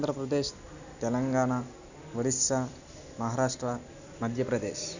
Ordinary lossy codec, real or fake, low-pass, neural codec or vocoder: none; real; 7.2 kHz; none